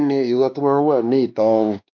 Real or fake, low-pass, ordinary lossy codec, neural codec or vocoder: fake; 7.2 kHz; none; codec, 16 kHz, 2 kbps, X-Codec, WavLM features, trained on Multilingual LibriSpeech